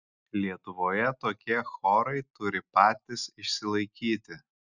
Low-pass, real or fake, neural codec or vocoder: 7.2 kHz; real; none